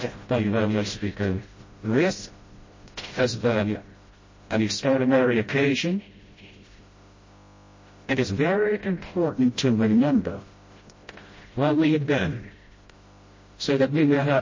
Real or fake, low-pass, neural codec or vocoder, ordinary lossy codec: fake; 7.2 kHz; codec, 16 kHz, 0.5 kbps, FreqCodec, smaller model; MP3, 32 kbps